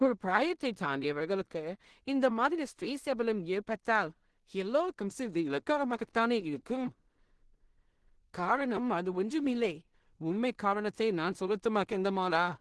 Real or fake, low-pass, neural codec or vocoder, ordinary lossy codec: fake; 10.8 kHz; codec, 16 kHz in and 24 kHz out, 0.4 kbps, LongCat-Audio-Codec, two codebook decoder; Opus, 16 kbps